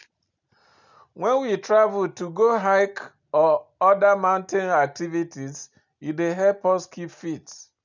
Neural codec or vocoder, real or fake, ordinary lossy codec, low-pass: none; real; none; 7.2 kHz